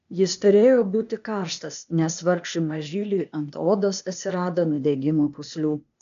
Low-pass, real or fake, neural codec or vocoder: 7.2 kHz; fake; codec, 16 kHz, 0.8 kbps, ZipCodec